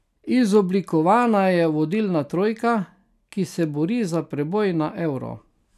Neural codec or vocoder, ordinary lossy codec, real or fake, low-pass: none; none; real; 14.4 kHz